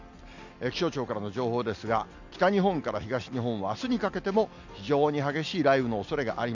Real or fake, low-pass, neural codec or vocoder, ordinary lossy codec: real; 7.2 kHz; none; none